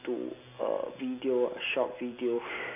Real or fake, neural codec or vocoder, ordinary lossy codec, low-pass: real; none; none; 3.6 kHz